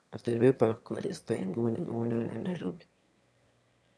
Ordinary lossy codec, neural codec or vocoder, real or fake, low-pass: none; autoencoder, 22.05 kHz, a latent of 192 numbers a frame, VITS, trained on one speaker; fake; none